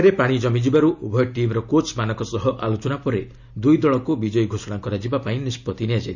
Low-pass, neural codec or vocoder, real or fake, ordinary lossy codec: 7.2 kHz; none; real; none